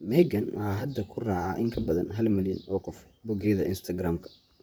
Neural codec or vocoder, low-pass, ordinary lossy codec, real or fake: vocoder, 44.1 kHz, 128 mel bands, Pupu-Vocoder; none; none; fake